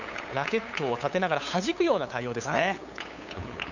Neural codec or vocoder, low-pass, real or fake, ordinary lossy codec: codec, 16 kHz, 8 kbps, FunCodec, trained on LibriTTS, 25 frames a second; 7.2 kHz; fake; none